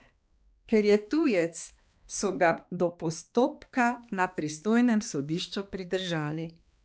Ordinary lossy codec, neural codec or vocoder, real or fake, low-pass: none; codec, 16 kHz, 2 kbps, X-Codec, HuBERT features, trained on balanced general audio; fake; none